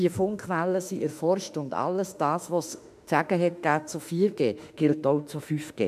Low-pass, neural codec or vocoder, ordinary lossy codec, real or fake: 14.4 kHz; autoencoder, 48 kHz, 32 numbers a frame, DAC-VAE, trained on Japanese speech; MP3, 96 kbps; fake